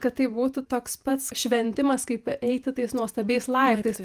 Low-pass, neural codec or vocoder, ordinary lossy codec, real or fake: 14.4 kHz; vocoder, 48 kHz, 128 mel bands, Vocos; Opus, 32 kbps; fake